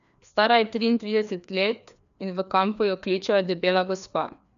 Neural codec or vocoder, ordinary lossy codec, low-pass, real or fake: codec, 16 kHz, 2 kbps, FreqCodec, larger model; MP3, 96 kbps; 7.2 kHz; fake